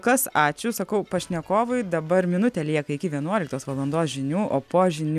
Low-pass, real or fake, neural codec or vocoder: 14.4 kHz; real; none